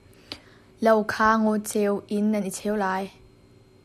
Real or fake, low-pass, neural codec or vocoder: real; 14.4 kHz; none